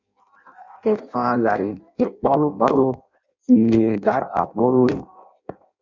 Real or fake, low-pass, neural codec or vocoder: fake; 7.2 kHz; codec, 16 kHz in and 24 kHz out, 0.6 kbps, FireRedTTS-2 codec